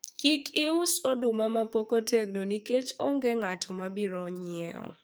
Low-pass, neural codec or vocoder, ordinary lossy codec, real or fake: none; codec, 44.1 kHz, 2.6 kbps, SNAC; none; fake